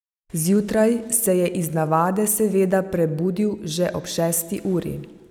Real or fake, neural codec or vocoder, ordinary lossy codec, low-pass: real; none; none; none